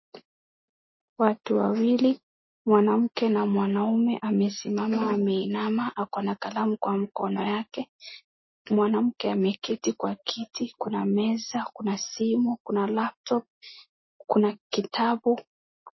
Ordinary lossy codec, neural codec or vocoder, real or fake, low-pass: MP3, 24 kbps; none; real; 7.2 kHz